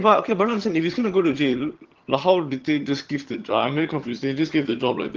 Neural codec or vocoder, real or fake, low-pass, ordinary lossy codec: vocoder, 22.05 kHz, 80 mel bands, HiFi-GAN; fake; 7.2 kHz; Opus, 16 kbps